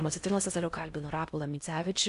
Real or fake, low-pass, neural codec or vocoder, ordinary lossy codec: fake; 10.8 kHz; codec, 16 kHz in and 24 kHz out, 0.6 kbps, FocalCodec, streaming, 4096 codes; AAC, 96 kbps